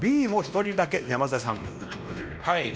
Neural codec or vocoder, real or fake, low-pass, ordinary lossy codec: codec, 16 kHz, 1 kbps, X-Codec, WavLM features, trained on Multilingual LibriSpeech; fake; none; none